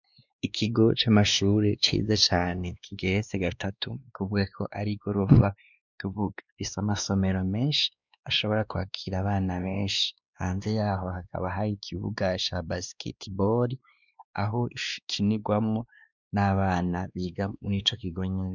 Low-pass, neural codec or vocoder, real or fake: 7.2 kHz; codec, 16 kHz, 2 kbps, X-Codec, WavLM features, trained on Multilingual LibriSpeech; fake